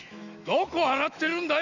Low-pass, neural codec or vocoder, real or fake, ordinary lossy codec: 7.2 kHz; autoencoder, 48 kHz, 128 numbers a frame, DAC-VAE, trained on Japanese speech; fake; none